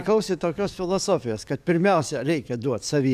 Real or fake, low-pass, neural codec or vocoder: fake; 14.4 kHz; codec, 44.1 kHz, 7.8 kbps, DAC